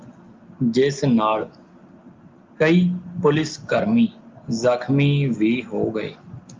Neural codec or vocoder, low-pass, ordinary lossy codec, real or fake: none; 7.2 kHz; Opus, 24 kbps; real